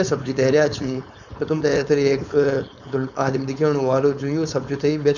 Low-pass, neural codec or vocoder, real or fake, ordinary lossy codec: 7.2 kHz; codec, 16 kHz, 4.8 kbps, FACodec; fake; none